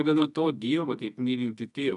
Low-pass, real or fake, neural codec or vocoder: 10.8 kHz; fake; codec, 24 kHz, 0.9 kbps, WavTokenizer, medium music audio release